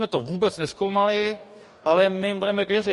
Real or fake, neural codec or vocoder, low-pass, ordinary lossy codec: fake; codec, 44.1 kHz, 2.6 kbps, DAC; 14.4 kHz; MP3, 48 kbps